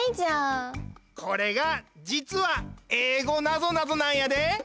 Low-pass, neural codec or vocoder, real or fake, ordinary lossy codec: none; none; real; none